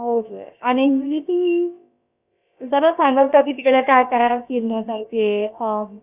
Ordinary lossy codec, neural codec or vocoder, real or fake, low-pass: none; codec, 16 kHz, about 1 kbps, DyCAST, with the encoder's durations; fake; 3.6 kHz